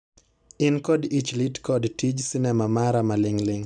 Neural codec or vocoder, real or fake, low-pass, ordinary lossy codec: none; real; 9.9 kHz; none